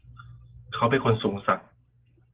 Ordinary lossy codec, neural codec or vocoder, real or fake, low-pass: Opus, 16 kbps; none; real; 3.6 kHz